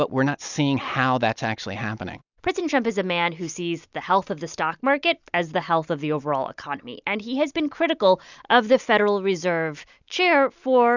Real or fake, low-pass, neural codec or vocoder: real; 7.2 kHz; none